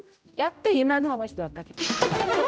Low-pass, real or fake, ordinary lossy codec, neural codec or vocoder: none; fake; none; codec, 16 kHz, 0.5 kbps, X-Codec, HuBERT features, trained on general audio